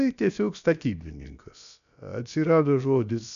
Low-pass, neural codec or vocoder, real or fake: 7.2 kHz; codec, 16 kHz, about 1 kbps, DyCAST, with the encoder's durations; fake